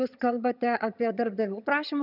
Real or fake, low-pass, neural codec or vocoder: fake; 5.4 kHz; vocoder, 22.05 kHz, 80 mel bands, HiFi-GAN